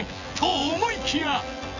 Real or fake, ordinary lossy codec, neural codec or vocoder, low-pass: fake; none; vocoder, 24 kHz, 100 mel bands, Vocos; 7.2 kHz